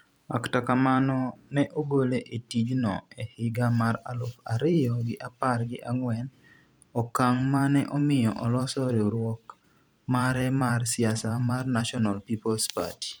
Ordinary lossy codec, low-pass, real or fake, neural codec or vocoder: none; none; fake; vocoder, 44.1 kHz, 128 mel bands every 512 samples, BigVGAN v2